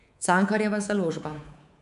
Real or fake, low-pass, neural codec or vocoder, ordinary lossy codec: fake; 10.8 kHz; codec, 24 kHz, 3.1 kbps, DualCodec; none